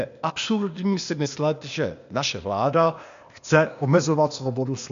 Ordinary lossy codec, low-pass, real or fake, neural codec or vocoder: MP3, 64 kbps; 7.2 kHz; fake; codec, 16 kHz, 0.8 kbps, ZipCodec